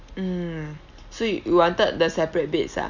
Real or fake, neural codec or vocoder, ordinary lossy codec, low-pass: real; none; none; 7.2 kHz